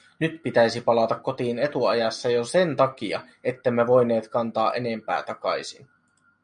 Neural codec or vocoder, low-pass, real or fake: none; 9.9 kHz; real